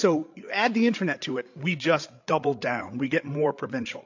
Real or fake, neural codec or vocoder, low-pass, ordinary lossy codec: fake; codec, 16 kHz, 8 kbps, FreqCodec, larger model; 7.2 kHz; AAC, 48 kbps